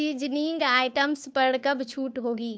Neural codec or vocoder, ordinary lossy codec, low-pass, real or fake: codec, 16 kHz, 2 kbps, FunCodec, trained on Chinese and English, 25 frames a second; none; none; fake